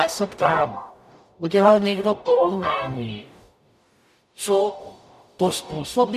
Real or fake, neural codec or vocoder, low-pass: fake; codec, 44.1 kHz, 0.9 kbps, DAC; 14.4 kHz